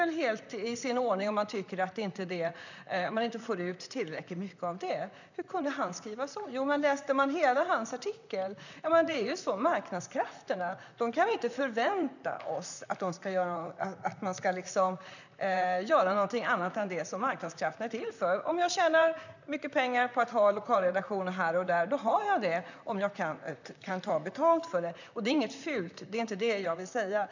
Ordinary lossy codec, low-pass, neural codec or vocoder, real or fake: none; 7.2 kHz; vocoder, 44.1 kHz, 128 mel bands, Pupu-Vocoder; fake